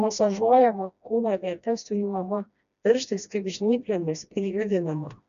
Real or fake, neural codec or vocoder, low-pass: fake; codec, 16 kHz, 1 kbps, FreqCodec, smaller model; 7.2 kHz